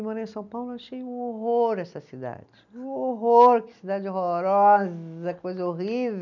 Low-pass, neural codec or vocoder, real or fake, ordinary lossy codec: 7.2 kHz; none; real; none